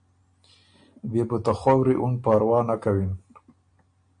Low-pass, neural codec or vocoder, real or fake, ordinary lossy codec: 9.9 kHz; none; real; MP3, 48 kbps